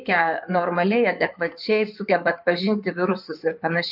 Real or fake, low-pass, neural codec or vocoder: fake; 5.4 kHz; codec, 16 kHz in and 24 kHz out, 2.2 kbps, FireRedTTS-2 codec